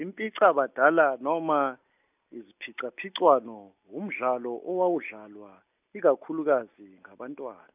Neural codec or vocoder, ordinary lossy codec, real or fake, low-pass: none; none; real; 3.6 kHz